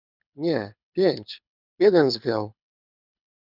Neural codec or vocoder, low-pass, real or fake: codec, 24 kHz, 6 kbps, HILCodec; 5.4 kHz; fake